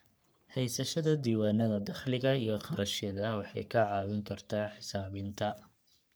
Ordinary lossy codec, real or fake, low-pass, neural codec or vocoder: none; fake; none; codec, 44.1 kHz, 3.4 kbps, Pupu-Codec